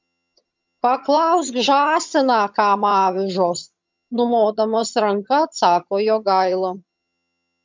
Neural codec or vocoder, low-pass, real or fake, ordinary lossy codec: vocoder, 22.05 kHz, 80 mel bands, HiFi-GAN; 7.2 kHz; fake; MP3, 64 kbps